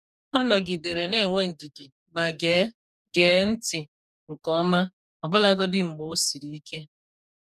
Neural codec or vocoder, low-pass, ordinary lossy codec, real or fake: codec, 44.1 kHz, 2.6 kbps, DAC; 14.4 kHz; none; fake